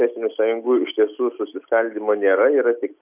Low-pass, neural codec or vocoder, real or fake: 3.6 kHz; none; real